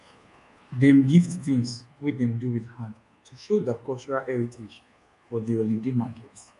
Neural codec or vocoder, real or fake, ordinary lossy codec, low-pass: codec, 24 kHz, 1.2 kbps, DualCodec; fake; none; 10.8 kHz